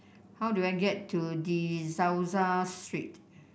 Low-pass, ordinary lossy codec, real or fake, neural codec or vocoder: none; none; real; none